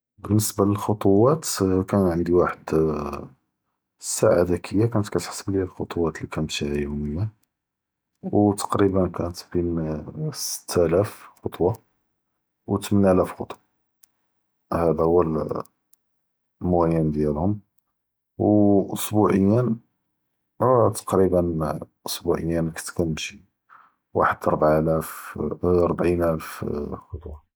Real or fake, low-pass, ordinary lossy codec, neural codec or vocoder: real; none; none; none